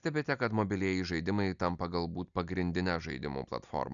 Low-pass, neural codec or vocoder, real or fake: 7.2 kHz; none; real